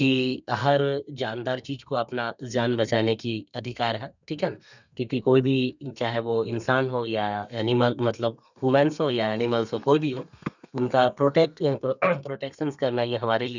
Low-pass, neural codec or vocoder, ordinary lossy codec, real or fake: 7.2 kHz; codec, 32 kHz, 1.9 kbps, SNAC; none; fake